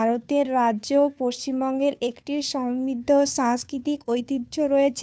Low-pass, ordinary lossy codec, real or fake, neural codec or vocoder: none; none; fake; codec, 16 kHz, 2 kbps, FunCodec, trained on Chinese and English, 25 frames a second